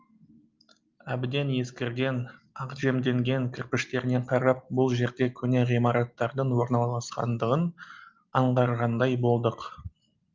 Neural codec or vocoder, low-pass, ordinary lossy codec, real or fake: none; 7.2 kHz; Opus, 32 kbps; real